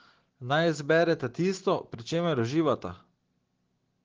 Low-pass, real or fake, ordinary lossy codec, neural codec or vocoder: 7.2 kHz; real; Opus, 16 kbps; none